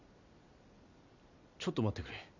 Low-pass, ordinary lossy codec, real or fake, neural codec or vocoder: 7.2 kHz; none; real; none